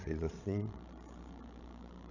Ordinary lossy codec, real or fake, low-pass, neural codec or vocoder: none; fake; 7.2 kHz; codec, 16 kHz, 16 kbps, FreqCodec, larger model